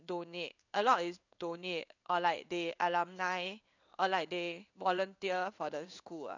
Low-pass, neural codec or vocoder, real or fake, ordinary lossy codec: 7.2 kHz; codec, 16 kHz in and 24 kHz out, 1 kbps, XY-Tokenizer; fake; none